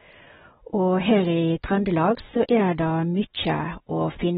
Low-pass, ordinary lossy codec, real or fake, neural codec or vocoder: 19.8 kHz; AAC, 16 kbps; real; none